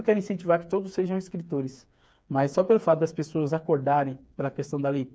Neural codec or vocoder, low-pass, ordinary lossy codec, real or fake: codec, 16 kHz, 4 kbps, FreqCodec, smaller model; none; none; fake